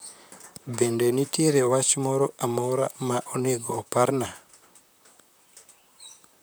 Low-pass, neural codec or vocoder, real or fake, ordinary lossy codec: none; vocoder, 44.1 kHz, 128 mel bands, Pupu-Vocoder; fake; none